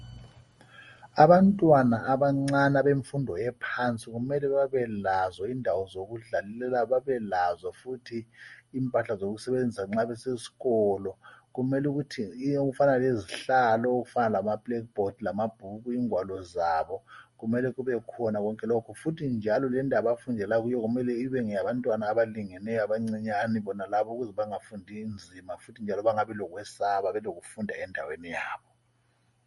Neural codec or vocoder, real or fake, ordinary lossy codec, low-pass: none; real; MP3, 48 kbps; 19.8 kHz